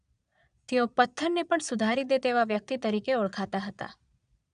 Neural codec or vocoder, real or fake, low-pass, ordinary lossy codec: vocoder, 22.05 kHz, 80 mel bands, Vocos; fake; 9.9 kHz; none